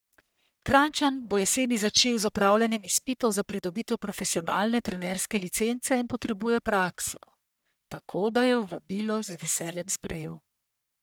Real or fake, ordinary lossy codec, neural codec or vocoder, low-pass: fake; none; codec, 44.1 kHz, 1.7 kbps, Pupu-Codec; none